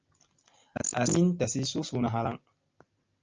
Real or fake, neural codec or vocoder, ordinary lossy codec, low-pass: real; none; Opus, 24 kbps; 7.2 kHz